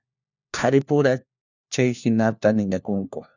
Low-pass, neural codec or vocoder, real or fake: 7.2 kHz; codec, 16 kHz, 1 kbps, FunCodec, trained on LibriTTS, 50 frames a second; fake